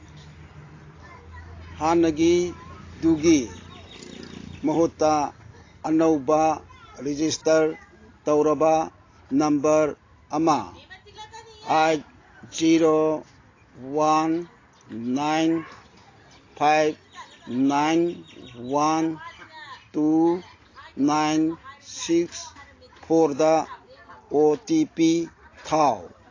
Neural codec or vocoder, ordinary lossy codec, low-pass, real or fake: none; AAC, 32 kbps; 7.2 kHz; real